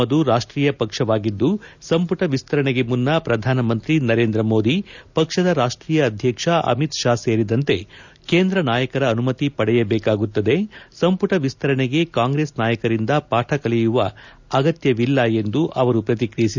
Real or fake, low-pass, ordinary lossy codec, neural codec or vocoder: real; 7.2 kHz; none; none